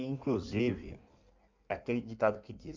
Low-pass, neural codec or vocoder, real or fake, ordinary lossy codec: 7.2 kHz; codec, 16 kHz in and 24 kHz out, 1.1 kbps, FireRedTTS-2 codec; fake; MP3, 64 kbps